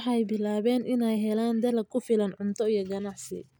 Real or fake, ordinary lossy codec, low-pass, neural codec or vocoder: real; none; none; none